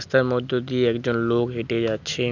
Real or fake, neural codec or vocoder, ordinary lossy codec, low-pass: real; none; none; 7.2 kHz